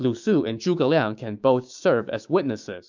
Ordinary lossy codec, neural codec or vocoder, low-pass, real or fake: MP3, 64 kbps; codec, 16 kHz, 6 kbps, DAC; 7.2 kHz; fake